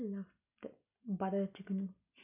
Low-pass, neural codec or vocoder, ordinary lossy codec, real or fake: 3.6 kHz; none; none; real